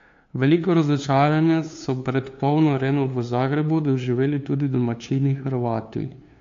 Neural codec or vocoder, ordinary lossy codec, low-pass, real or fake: codec, 16 kHz, 2 kbps, FunCodec, trained on LibriTTS, 25 frames a second; AAC, 48 kbps; 7.2 kHz; fake